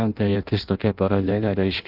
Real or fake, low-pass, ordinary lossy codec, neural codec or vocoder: fake; 5.4 kHz; Opus, 24 kbps; codec, 16 kHz in and 24 kHz out, 0.6 kbps, FireRedTTS-2 codec